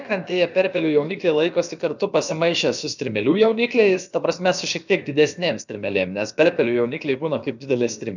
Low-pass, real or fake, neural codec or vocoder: 7.2 kHz; fake; codec, 16 kHz, about 1 kbps, DyCAST, with the encoder's durations